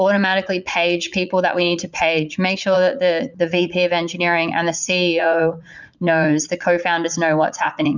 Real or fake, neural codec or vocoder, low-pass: fake; vocoder, 44.1 kHz, 80 mel bands, Vocos; 7.2 kHz